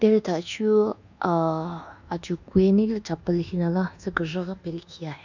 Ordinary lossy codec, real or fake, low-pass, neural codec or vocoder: none; fake; 7.2 kHz; codec, 24 kHz, 1.2 kbps, DualCodec